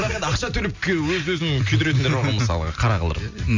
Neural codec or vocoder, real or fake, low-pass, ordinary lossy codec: none; real; 7.2 kHz; MP3, 64 kbps